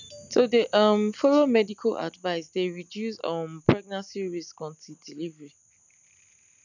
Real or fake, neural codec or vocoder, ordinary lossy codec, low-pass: real; none; none; 7.2 kHz